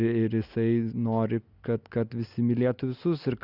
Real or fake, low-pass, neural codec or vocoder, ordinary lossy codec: real; 5.4 kHz; none; Opus, 64 kbps